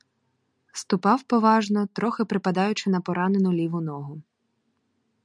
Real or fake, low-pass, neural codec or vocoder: real; 9.9 kHz; none